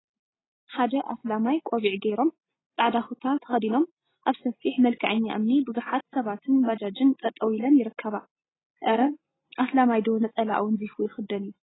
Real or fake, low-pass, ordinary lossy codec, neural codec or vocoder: real; 7.2 kHz; AAC, 16 kbps; none